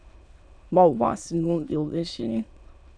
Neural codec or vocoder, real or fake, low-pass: autoencoder, 22.05 kHz, a latent of 192 numbers a frame, VITS, trained on many speakers; fake; 9.9 kHz